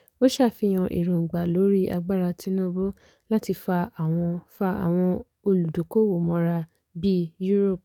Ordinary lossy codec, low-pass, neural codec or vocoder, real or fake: none; 19.8 kHz; codec, 44.1 kHz, 7.8 kbps, DAC; fake